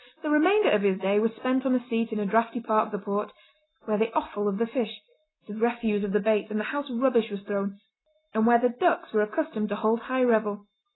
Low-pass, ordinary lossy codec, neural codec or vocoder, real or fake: 7.2 kHz; AAC, 16 kbps; none; real